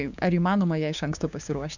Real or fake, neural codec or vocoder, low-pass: fake; codec, 16 kHz, 6 kbps, DAC; 7.2 kHz